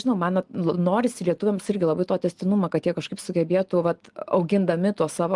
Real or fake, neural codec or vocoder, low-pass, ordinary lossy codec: real; none; 10.8 kHz; Opus, 24 kbps